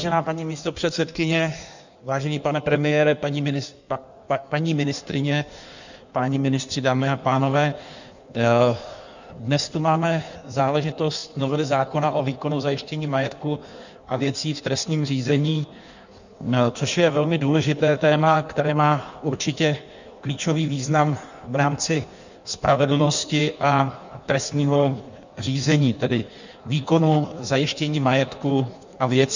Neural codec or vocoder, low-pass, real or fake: codec, 16 kHz in and 24 kHz out, 1.1 kbps, FireRedTTS-2 codec; 7.2 kHz; fake